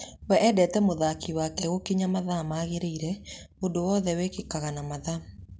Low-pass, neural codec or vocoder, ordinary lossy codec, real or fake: none; none; none; real